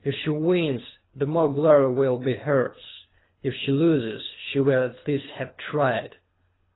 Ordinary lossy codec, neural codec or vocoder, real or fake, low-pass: AAC, 16 kbps; codec, 24 kHz, 3 kbps, HILCodec; fake; 7.2 kHz